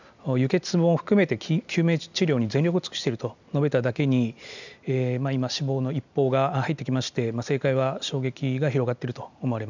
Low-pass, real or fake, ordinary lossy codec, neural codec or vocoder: 7.2 kHz; real; none; none